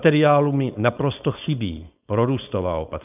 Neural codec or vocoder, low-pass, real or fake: codec, 16 kHz, 4.8 kbps, FACodec; 3.6 kHz; fake